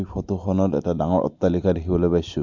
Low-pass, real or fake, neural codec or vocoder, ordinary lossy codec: 7.2 kHz; real; none; MP3, 64 kbps